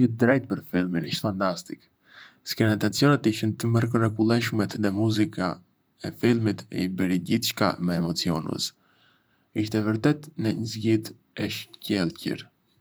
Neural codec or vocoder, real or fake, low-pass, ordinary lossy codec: vocoder, 44.1 kHz, 128 mel bands, Pupu-Vocoder; fake; none; none